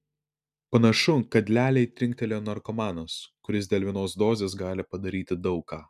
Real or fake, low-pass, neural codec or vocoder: real; 14.4 kHz; none